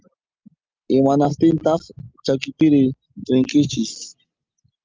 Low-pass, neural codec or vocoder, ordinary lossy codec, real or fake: 7.2 kHz; none; Opus, 24 kbps; real